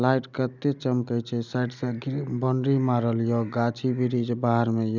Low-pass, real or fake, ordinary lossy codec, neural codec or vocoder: 7.2 kHz; real; none; none